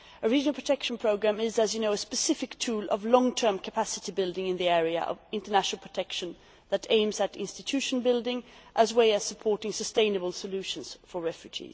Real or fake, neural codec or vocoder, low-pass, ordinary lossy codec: real; none; none; none